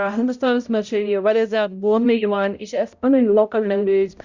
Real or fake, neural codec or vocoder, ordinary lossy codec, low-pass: fake; codec, 16 kHz, 0.5 kbps, X-Codec, HuBERT features, trained on balanced general audio; Opus, 64 kbps; 7.2 kHz